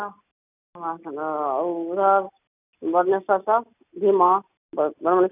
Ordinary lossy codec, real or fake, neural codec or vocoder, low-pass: none; real; none; 3.6 kHz